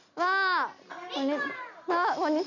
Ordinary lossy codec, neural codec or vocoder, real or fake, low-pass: none; none; real; 7.2 kHz